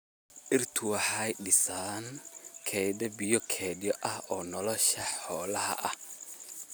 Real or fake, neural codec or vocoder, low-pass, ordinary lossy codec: real; none; none; none